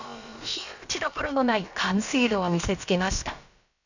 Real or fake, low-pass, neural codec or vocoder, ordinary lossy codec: fake; 7.2 kHz; codec, 16 kHz, about 1 kbps, DyCAST, with the encoder's durations; none